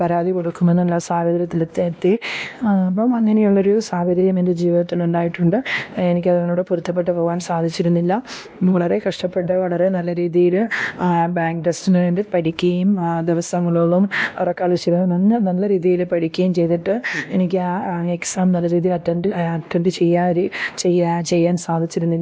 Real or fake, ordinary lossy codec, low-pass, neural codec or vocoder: fake; none; none; codec, 16 kHz, 1 kbps, X-Codec, WavLM features, trained on Multilingual LibriSpeech